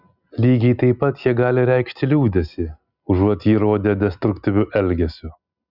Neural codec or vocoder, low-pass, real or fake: none; 5.4 kHz; real